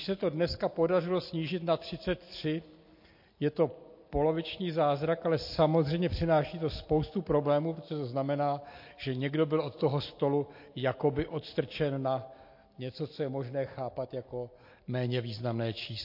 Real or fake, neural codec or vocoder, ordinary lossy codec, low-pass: real; none; MP3, 32 kbps; 5.4 kHz